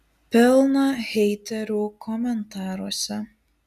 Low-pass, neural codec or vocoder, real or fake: 14.4 kHz; none; real